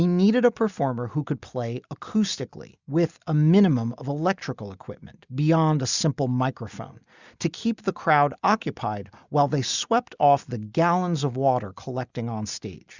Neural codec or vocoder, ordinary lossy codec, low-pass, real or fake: none; Opus, 64 kbps; 7.2 kHz; real